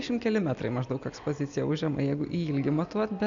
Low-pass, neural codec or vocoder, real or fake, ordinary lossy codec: 7.2 kHz; none; real; MP3, 64 kbps